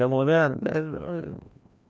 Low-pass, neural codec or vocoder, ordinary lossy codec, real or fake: none; codec, 16 kHz, 1 kbps, FreqCodec, larger model; none; fake